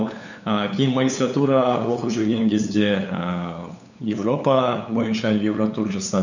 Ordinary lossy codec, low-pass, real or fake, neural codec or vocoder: none; 7.2 kHz; fake; codec, 16 kHz, 8 kbps, FunCodec, trained on LibriTTS, 25 frames a second